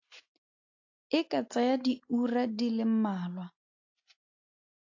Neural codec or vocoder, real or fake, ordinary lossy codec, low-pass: none; real; AAC, 48 kbps; 7.2 kHz